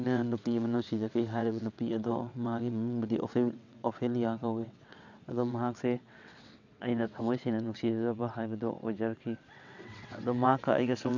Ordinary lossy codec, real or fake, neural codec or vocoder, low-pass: none; fake; vocoder, 22.05 kHz, 80 mel bands, WaveNeXt; 7.2 kHz